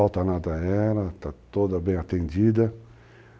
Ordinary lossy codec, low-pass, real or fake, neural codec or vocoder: none; none; real; none